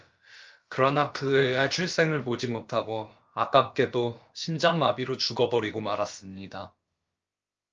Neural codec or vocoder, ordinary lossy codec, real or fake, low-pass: codec, 16 kHz, about 1 kbps, DyCAST, with the encoder's durations; Opus, 24 kbps; fake; 7.2 kHz